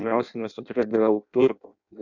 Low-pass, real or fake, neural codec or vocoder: 7.2 kHz; fake; codec, 16 kHz in and 24 kHz out, 0.6 kbps, FireRedTTS-2 codec